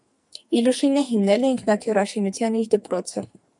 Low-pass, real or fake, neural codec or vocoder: 10.8 kHz; fake; codec, 44.1 kHz, 2.6 kbps, SNAC